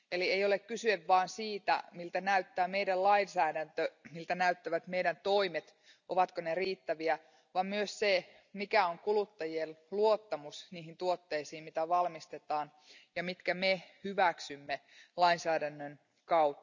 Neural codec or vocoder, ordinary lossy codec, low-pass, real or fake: none; none; 7.2 kHz; real